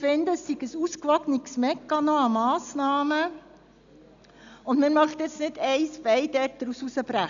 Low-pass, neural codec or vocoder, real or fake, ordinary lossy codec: 7.2 kHz; none; real; none